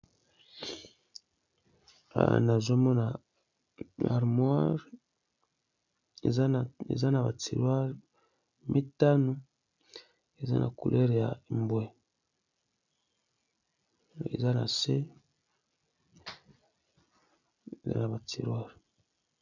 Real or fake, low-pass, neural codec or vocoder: real; 7.2 kHz; none